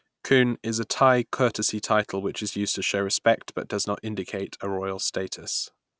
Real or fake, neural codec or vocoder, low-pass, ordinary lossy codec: real; none; none; none